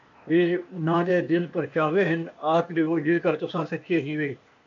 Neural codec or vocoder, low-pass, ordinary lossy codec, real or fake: codec, 16 kHz, 0.8 kbps, ZipCodec; 7.2 kHz; AAC, 48 kbps; fake